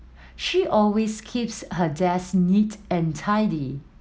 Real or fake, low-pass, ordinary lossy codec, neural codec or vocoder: real; none; none; none